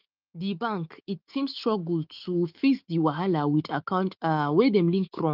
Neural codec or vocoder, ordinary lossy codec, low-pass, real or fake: autoencoder, 48 kHz, 128 numbers a frame, DAC-VAE, trained on Japanese speech; Opus, 32 kbps; 5.4 kHz; fake